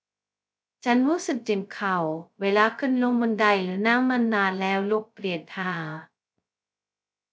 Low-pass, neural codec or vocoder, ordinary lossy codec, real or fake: none; codec, 16 kHz, 0.2 kbps, FocalCodec; none; fake